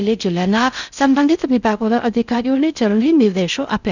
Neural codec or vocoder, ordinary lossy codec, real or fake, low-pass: codec, 16 kHz in and 24 kHz out, 0.6 kbps, FocalCodec, streaming, 4096 codes; none; fake; 7.2 kHz